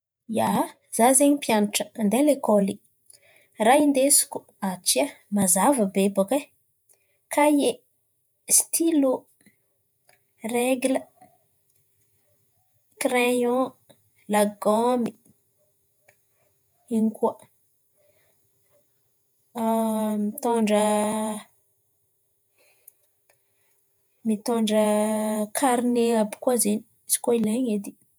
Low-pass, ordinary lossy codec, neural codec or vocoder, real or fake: none; none; vocoder, 48 kHz, 128 mel bands, Vocos; fake